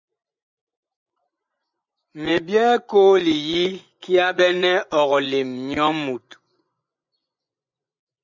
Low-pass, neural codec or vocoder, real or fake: 7.2 kHz; vocoder, 24 kHz, 100 mel bands, Vocos; fake